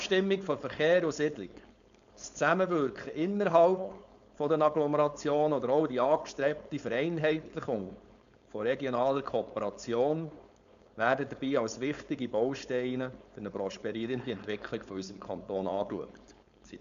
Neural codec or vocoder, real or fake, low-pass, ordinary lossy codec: codec, 16 kHz, 4.8 kbps, FACodec; fake; 7.2 kHz; none